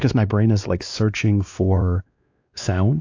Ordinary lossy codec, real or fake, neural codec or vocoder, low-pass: AAC, 48 kbps; fake; codec, 16 kHz, 2 kbps, X-Codec, WavLM features, trained on Multilingual LibriSpeech; 7.2 kHz